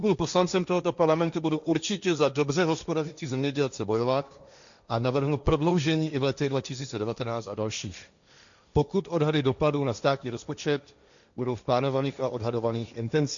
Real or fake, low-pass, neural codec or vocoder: fake; 7.2 kHz; codec, 16 kHz, 1.1 kbps, Voila-Tokenizer